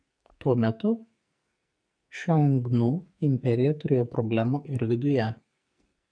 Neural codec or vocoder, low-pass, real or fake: codec, 44.1 kHz, 2.6 kbps, SNAC; 9.9 kHz; fake